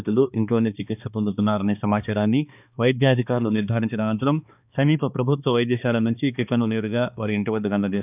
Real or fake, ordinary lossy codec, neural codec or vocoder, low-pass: fake; none; codec, 16 kHz, 2 kbps, X-Codec, HuBERT features, trained on balanced general audio; 3.6 kHz